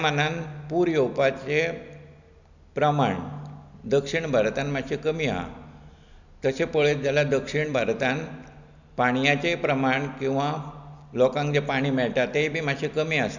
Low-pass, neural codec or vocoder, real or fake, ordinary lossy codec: 7.2 kHz; none; real; none